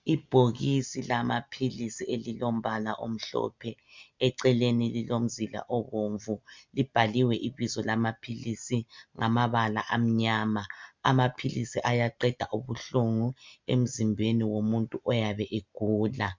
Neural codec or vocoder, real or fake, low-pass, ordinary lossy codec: none; real; 7.2 kHz; MP3, 64 kbps